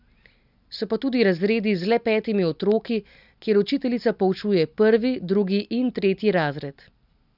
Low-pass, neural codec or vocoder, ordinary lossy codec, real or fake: 5.4 kHz; none; none; real